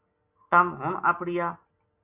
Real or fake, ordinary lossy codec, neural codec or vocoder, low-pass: real; Opus, 64 kbps; none; 3.6 kHz